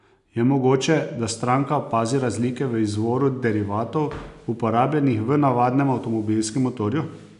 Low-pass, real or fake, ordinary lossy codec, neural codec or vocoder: 10.8 kHz; real; none; none